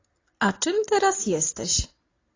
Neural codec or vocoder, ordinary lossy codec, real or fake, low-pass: none; AAC, 32 kbps; real; 7.2 kHz